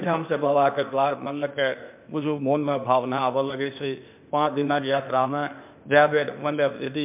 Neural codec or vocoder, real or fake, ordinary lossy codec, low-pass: codec, 16 kHz, 0.8 kbps, ZipCodec; fake; MP3, 32 kbps; 3.6 kHz